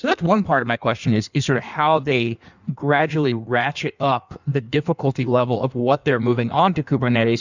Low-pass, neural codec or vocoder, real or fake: 7.2 kHz; codec, 16 kHz in and 24 kHz out, 1.1 kbps, FireRedTTS-2 codec; fake